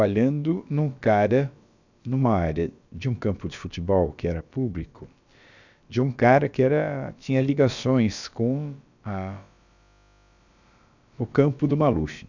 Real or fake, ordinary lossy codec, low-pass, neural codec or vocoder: fake; none; 7.2 kHz; codec, 16 kHz, about 1 kbps, DyCAST, with the encoder's durations